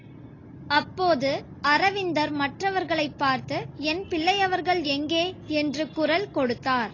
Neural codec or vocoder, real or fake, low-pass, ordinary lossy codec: none; real; 7.2 kHz; AAC, 32 kbps